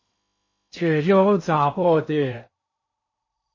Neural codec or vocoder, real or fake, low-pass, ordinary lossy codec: codec, 16 kHz in and 24 kHz out, 0.8 kbps, FocalCodec, streaming, 65536 codes; fake; 7.2 kHz; MP3, 32 kbps